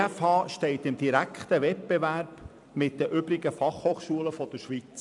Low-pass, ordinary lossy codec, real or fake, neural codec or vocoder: 10.8 kHz; none; fake; vocoder, 44.1 kHz, 128 mel bands every 256 samples, BigVGAN v2